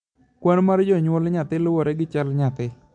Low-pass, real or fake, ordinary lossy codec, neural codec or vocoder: 9.9 kHz; real; MP3, 64 kbps; none